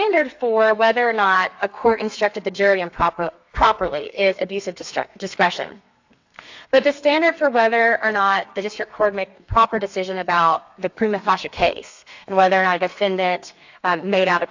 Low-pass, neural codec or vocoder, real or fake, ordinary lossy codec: 7.2 kHz; codec, 32 kHz, 1.9 kbps, SNAC; fake; AAC, 48 kbps